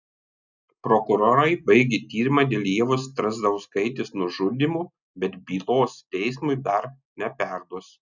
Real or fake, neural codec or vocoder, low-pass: real; none; 7.2 kHz